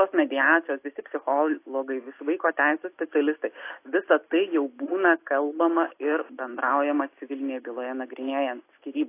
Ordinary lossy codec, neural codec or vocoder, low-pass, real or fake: AAC, 24 kbps; none; 3.6 kHz; real